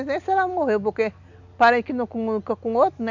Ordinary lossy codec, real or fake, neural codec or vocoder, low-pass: none; real; none; 7.2 kHz